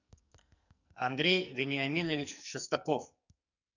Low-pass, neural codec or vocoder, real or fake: 7.2 kHz; codec, 44.1 kHz, 2.6 kbps, SNAC; fake